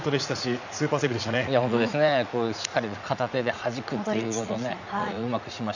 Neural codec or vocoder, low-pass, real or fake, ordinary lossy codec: vocoder, 44.1 kHz, 80 mel bands, Vocos; 7.2 kHz; fake; none